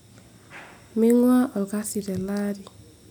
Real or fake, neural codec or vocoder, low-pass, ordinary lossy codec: real; none; none; none